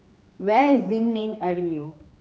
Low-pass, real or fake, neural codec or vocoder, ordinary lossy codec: none; fake; codec, 16 kHz, 2 kbps, X-Codec, HuBERT features, trained on general audio; none